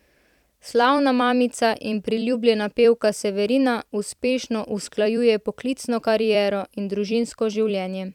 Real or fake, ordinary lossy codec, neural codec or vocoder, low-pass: fake; none; vocoder, 44.1 kHz, 128 mel bands every 256 samples, BigVGAN v2; 19.8 kHz